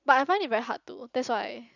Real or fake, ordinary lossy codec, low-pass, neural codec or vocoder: real; none; 7.2 kHz; none